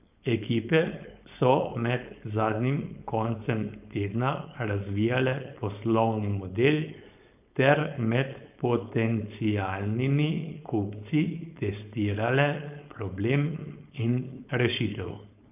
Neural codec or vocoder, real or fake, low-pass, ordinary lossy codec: codec, 16 kHz, 4.8 kbps, FACodec; fake; 3.6 kHz; none